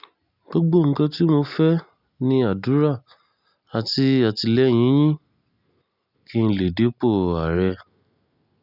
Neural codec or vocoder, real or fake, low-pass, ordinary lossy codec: none; real; 5.4 kHz; none